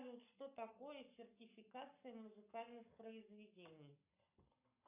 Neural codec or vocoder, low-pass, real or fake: codec, 44.1 kHz, 7.8 kbps, Pupu-Codec; 3.6 kHz; fake